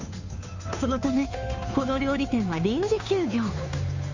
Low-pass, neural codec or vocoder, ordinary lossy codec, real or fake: 7.2 kHz; codec, 16 kHz, 2 kbps, FunCodec, trained on Chinese and English, 25 frames a second; Opus, 64 kbps; fake